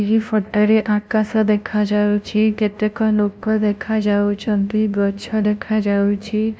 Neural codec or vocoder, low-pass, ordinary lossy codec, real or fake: codec, 16 kHz, 0.5 kbps, FunCodec, trained on LibriTTS, 25 frames a second; none; none; fake